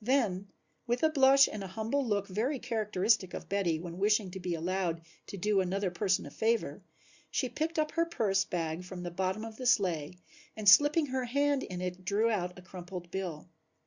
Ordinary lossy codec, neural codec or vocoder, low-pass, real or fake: Opus, 64 kbps; none; 7.2 kHz; real